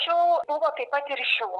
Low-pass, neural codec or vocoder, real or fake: 10.8 kHz; none; real